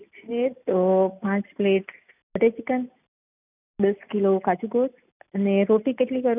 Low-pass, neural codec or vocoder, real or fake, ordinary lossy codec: 3.6 kHz; none; real; none